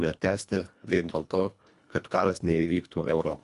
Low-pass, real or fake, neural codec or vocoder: 10.8 kHz; fake; codec, 24 kHz, 1.5 kbps, HILCodec